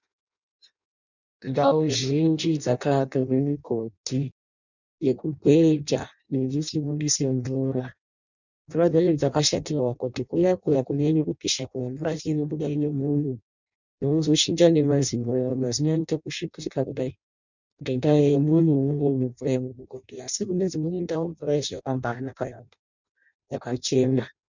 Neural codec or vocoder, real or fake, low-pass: codec, 16 kHz in and 24 kHz out, 0.6 kbps, FireRedTTS-2 codec; fake; 7.2 kHz